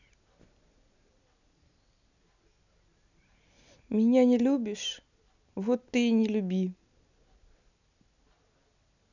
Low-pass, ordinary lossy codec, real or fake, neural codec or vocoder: 7.2 kHz; none; real; none